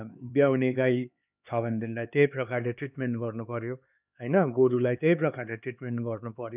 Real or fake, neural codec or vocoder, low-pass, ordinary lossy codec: fake; codec, 16 kHz, 4 kbps, X-Codec, HuBERT features, trained on LibriSpeech; 3.6 kHz; none